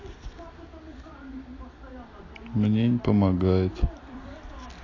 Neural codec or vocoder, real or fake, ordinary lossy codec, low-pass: none; real; AAC, 32 kbps; 7.2 kHz